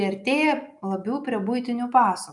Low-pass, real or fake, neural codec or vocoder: 10.8 kHz; real; none